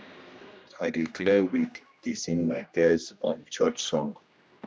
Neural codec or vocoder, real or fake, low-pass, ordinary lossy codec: codec, 16 kHz, 1 kbps, X-Codec, HuBERT features, trained on general audio; fake; none; none